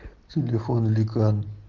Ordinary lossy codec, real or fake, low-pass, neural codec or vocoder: Opus, 16 kbps; real; 7.2 kHz; none